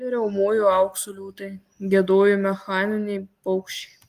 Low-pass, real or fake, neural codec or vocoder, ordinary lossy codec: 14.4 kHz; real; none; Opus, 24 kbps